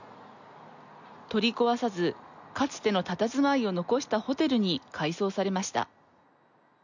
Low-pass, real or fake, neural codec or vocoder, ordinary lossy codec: 7.2 kHz; real; none; none